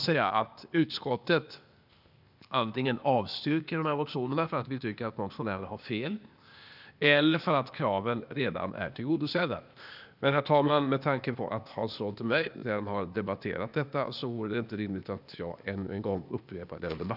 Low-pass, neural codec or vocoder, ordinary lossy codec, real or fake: 5.4 kHz; codec, 16 kHz, 0.8 kbps, ZipCodec; none; fake